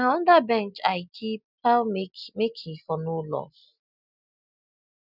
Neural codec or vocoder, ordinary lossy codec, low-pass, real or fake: none; none; 5.4 kHz; real